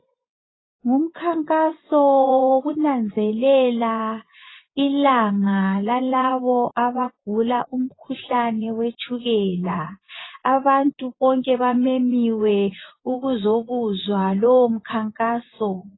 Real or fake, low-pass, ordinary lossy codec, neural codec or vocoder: fake; 7.2 kHz; AAC, 16 kbps; vocoder, 24 kHz, 100 mel bands, Vocos